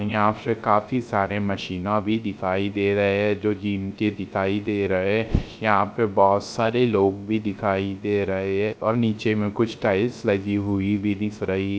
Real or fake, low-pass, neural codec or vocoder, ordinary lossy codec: fake; none; codec, 16 kHz, 0.3 kbps, FocalCodec; none